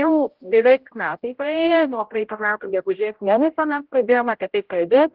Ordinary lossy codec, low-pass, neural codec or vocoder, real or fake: Opus, 16 kbps; 5.4 kHz; codec, 16 kHz, 0.5 kbps, X-Codec, HuBERT features, trained on general audio; fake